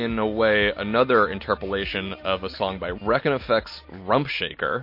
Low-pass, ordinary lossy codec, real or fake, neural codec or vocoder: 5.4 kHz; MP3, 32 kbps; real; none